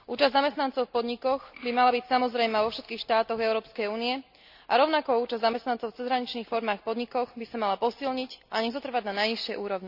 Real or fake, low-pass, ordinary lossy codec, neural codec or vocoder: real; 5.4 kHz; none; none